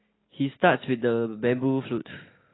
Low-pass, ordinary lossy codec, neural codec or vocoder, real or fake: 7.2 kHz; AAC, 16 kbps; none; real